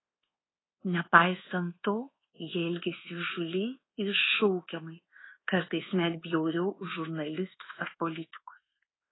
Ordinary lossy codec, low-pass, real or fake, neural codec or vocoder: AAC, 16 kbps; 7.2 kHz; fake; codec, 24 kHz, 1.2 kbps, DualCodec